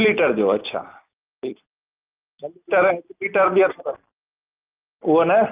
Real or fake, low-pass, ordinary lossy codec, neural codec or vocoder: real; 3.6 kHz; Opus, 24 kbps; none